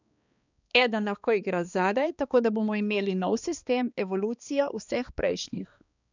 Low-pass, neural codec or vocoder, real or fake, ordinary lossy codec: 7.2 kHz; codec, 16 kHz, 2 kbps, X-Codec, HuBERT features, trained on balanced general audio; fake; none